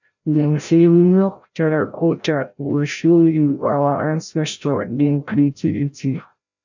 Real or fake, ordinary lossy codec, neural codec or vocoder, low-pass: fake; none; codec, 16 kHz, 0.5 kbps, FreqCodec, larger model; 7.2 kHz